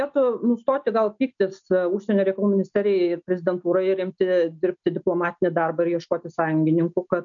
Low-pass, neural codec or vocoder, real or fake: 7.2 kHz; none; real